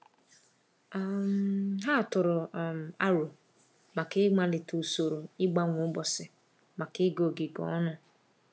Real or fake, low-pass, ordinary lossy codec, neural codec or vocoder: real; none; none; none